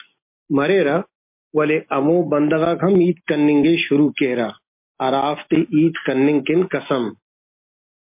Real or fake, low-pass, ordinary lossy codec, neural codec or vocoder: real; 3.6 kHz; MP3, 32 kbps; none